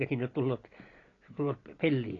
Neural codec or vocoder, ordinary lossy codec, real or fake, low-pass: none; AAC, 32 kbps; real; 7.2 kHz